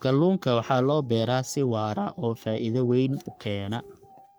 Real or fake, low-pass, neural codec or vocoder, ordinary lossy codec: fake; none; codec, 44.1 kHz, 3.4 kbps, Pupu-Codec; none